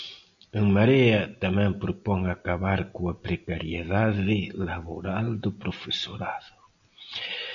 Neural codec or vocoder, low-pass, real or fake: none; 7.2 kHz; real